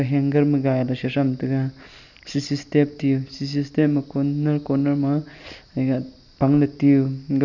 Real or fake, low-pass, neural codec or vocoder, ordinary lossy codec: real; 7.2 kHz; none; none